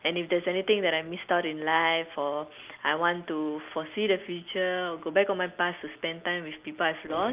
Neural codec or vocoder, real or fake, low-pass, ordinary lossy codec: none; real; 3.6 kHz; Opus, 24 kbps